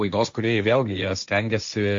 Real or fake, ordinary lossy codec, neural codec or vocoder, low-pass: fake; MP3, 48 kbps; codec, 16 kHz, 1.1 kbps, Voila-Tokenizer; 7.2 kHz